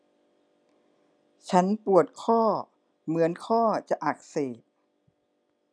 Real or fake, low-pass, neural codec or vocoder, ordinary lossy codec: real; 9.9 kHz; none; none